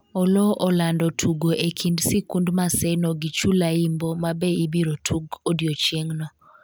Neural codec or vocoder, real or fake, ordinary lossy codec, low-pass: none; real; none; none